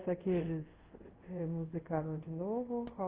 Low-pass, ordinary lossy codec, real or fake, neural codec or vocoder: 3.6 kHz; Opus, 16 kbps; fake; codec, 24 kHz, 0.5 kbps, DualCodec